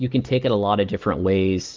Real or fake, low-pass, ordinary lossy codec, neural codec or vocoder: real; 7.2 kHz; Opus, 24 kbps; none